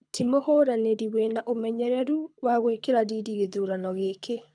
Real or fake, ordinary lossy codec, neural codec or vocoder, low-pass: fake; none; codec, 24 kHz, 6 kbps, HILCodec; 9.9 kHz